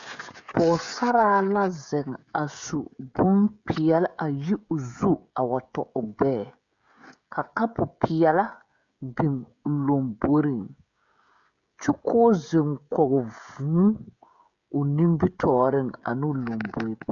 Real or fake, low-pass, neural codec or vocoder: fake; 7.2 kHz; codec, 16 kHz, 16 kbps, FreqCodec, smaller model